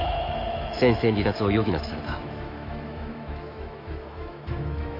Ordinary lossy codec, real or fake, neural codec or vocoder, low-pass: AAC, 32 kbps; fake; autoencoder, 48 kHz, 128 numbers a frame, DAC-VAE, trained on Japanese speech; 5.4 kHz